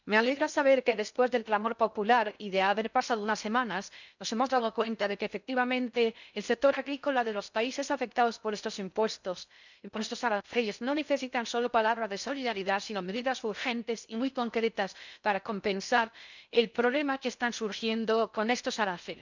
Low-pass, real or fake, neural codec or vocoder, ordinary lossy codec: 7.2 kHz; fake; codec, 16 kHz in and 24 kHz out, 0.6 kbps, FocalCodec, streaming, 2048 codes; none